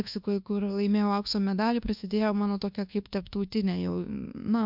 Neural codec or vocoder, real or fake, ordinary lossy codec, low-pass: codec, 24 kHz, 1.2 kbps, DualCodec; fake; MP3, 48 kbps; 5.4 kHz